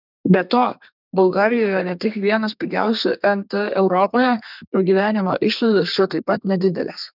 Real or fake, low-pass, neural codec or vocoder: fake; 5.4 kHz; codec, 32 kHz, 1.9 kbps, SNAC